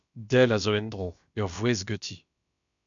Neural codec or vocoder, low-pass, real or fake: codec, 16 kHz, about 1 kbps, DyCAST, with the encoder's durations; 7.2 kHz; fake